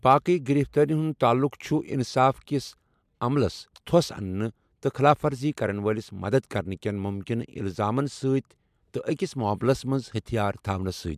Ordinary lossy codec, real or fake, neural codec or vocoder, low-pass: MP3, 96 kbps; real; none; 14.4 kHz